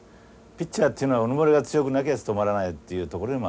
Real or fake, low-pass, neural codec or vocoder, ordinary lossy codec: real; none; none; none